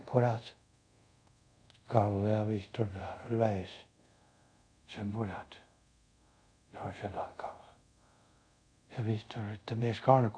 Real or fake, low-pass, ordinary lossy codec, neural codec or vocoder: fake; 9.9 kHz; none; codec, 24 kHz, 0.5 kbps, DualCodec